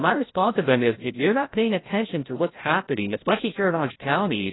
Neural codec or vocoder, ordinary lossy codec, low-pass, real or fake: codec, 16 kHz, 0.5 kbps, FreqCodec, larger model; AAC, 16 kbps; 7.2 kHz; fake